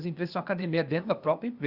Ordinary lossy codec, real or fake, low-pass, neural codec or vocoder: Opus, 64 kbps; fake; 5.4 kHz; codec, 16 kHz, 0.8 kbps, ZipCodec